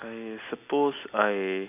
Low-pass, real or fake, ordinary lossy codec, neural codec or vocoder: 3.6 kHz; real; none; none